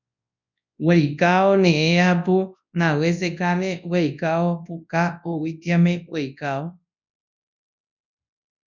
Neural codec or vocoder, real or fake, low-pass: codec, 24 kHz, 0.9 kbps, WavTokenizer, large speech release; fake; 7.2 kHz